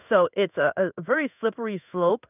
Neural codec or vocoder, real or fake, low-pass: codec, 16 kHz in and 24 kHz out, 1 kbps, XY-Tokenizer; fake; 3.6 kHz